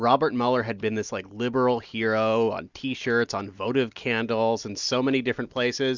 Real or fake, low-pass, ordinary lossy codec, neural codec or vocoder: real; 7.2 kHz; Opus, 64 kbps; none